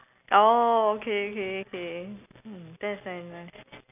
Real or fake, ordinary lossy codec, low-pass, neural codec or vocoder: real; none; 3.6 kHz; none